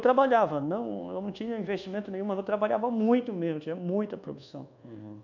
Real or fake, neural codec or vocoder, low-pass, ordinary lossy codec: fake; codec, 24 kHz, 1.2 kbps, DualCodec; 7.2 kHz; none